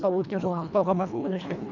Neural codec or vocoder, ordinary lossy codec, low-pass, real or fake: codec, 24 kHz, 1.5 kbps, HILCodec; none; 7.2 kHz; fake